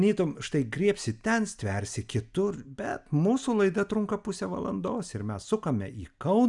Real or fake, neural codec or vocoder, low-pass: real; none; 10.8 kHz